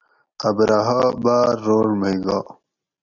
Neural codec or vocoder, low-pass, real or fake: none; 7.2 kHz; real